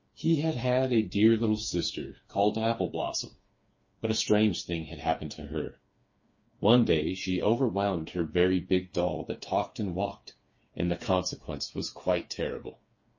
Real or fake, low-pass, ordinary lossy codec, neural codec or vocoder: fake; 7.2 kHz; MP3, 32 kbps; codec, 16 kHz, 4 kbps, FreqCodec, smaller model